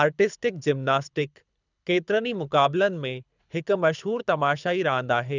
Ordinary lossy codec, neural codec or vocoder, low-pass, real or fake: none; codec, 24 kHz, 6 kbps, HILCodec; 7.2 kHz; fake